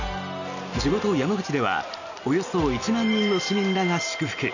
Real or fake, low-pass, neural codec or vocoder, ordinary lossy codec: real; 7.2 kHz; none; none